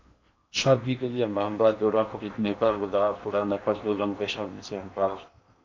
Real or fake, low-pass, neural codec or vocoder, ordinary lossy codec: fake; 7.2 kHz; codec, 16 kHz in and 24 kHz out, 0.8 kbps, FocalCodec, streaming, 65536 codes; MP3, 64 kbps